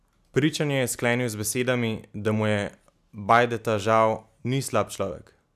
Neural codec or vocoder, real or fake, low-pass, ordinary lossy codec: none; real; 14.4 kHz; none